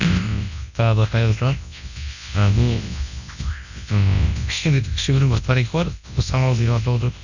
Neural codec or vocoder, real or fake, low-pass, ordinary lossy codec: codec, 24 kHz, 0.9 kbps, WavTokenizer, large speech release; fake; 7.2 kHz; none